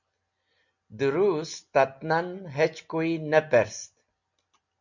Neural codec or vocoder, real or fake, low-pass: none; real; 7.2 kHz